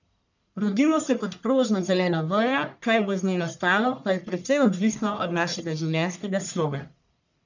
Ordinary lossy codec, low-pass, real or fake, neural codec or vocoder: none; 7.2 kHz; fake; codec, 44.1 kHz, 1.7 kbps, Pupu-Codec